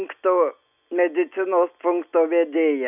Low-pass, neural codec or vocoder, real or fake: 3.6 kHz; none; real